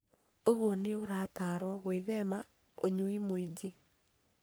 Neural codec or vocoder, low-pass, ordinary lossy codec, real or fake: codec, 44.1 kHz, 3.4 kbps, Pupu-Codec; none; none; fake